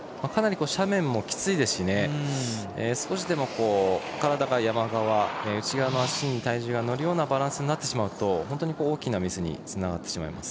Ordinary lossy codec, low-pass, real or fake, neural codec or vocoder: none; none; real; none